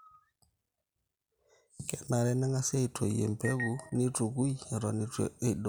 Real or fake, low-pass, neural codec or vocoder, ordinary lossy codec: real; none; none; none